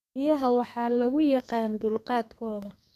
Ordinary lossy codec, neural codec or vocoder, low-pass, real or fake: none; codec, 32 kHz, 1.9 kbps, SNAC; 14.4 kHz; fake